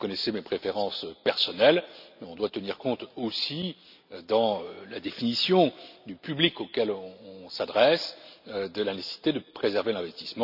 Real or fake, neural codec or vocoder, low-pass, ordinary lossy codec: real; none; 5.4 kHz; none